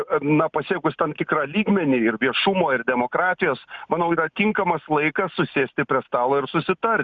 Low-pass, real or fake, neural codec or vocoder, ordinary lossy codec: 7.2 kHz; real; none; Opus, 64 kbps